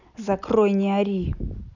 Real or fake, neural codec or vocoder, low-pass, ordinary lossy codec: real; none; 7.2 kHz; none